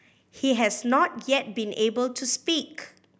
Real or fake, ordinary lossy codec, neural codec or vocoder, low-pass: real; none; none; none